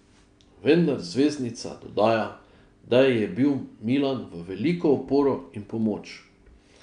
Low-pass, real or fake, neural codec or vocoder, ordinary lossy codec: 9.9 kHz; real; none; none